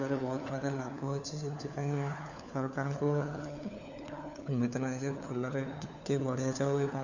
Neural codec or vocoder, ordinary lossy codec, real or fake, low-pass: codec, 16 kHz, 4 kbps, FunCodec, trained on LibriTTS, 50 frames a second; none; fake; 7.2 kHz